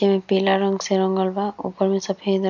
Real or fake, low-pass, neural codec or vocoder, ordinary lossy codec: real; 7.2 kHz; none; none